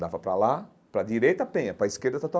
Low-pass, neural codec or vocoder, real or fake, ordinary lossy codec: none; none; real; none